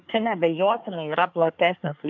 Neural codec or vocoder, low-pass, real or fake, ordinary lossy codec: codec, 24 kHz, 1 kbps, SNAC; 7.2 kHz; fake; MP3, 64 kbps